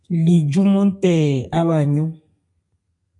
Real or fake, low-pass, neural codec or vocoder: fake; 10.8 kHz; codec, 32 kHz, 1.9 kbps, SNAC